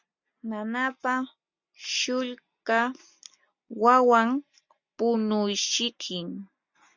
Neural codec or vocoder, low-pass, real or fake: none; 7.2 kHz; real